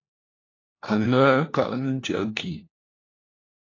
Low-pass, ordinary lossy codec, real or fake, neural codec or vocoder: 7.2 kHz; AAC, 32 kbps; fake; codec, 16 kHz, 1 kbps, FunCodec, trained on LibriTTS, 50 frames a second